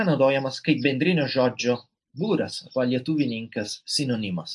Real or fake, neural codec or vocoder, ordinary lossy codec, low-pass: real; none; AAC, 64 kbps; 10.8 kHz